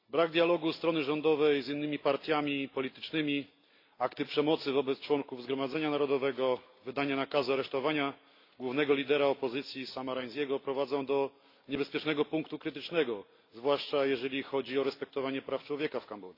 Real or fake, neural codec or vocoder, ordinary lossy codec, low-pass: real; none; AAC, 32 kbps; 5.4 kHz